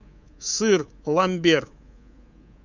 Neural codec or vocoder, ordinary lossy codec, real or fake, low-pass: codec, 24 kHz, 3.1 kbps, DualCodec; Opus, 64 kbps; fake; 7.2 kHz